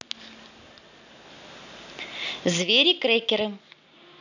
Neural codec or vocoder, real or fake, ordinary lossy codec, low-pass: none; real; none; 7.2 kHz